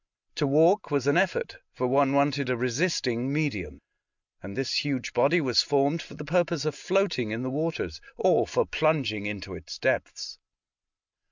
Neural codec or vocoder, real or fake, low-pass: none; real; 7.2 kHz